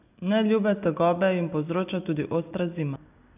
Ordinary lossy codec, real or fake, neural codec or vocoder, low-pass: none; real; none; 3.6 kHz